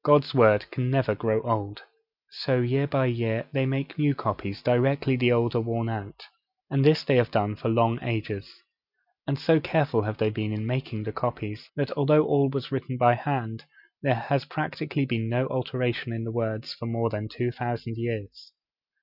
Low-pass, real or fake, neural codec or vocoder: 5.4 kHz; real; none